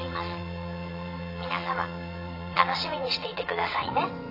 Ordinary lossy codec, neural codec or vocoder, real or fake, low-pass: AAC, 32 kbps; vocoder, 22.05 kHz, 80 mel bands, WaveNeXt; fake; 5.4 kHz